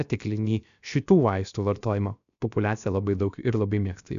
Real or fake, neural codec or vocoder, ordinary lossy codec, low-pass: fake; codec, 16 kHz, 0.7 kbps, FocalCodec; AAC, 96 kbps; 7.2 kHz